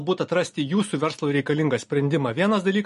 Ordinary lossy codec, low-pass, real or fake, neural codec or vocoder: MP3, 48 kbps; 14.4 kHz; real; none